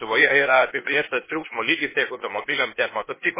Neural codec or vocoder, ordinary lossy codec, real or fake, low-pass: codec, 16 kHz, 0.8 kbps, ZipCodec; MP3, 16 kbps; fake; 3.6 kHz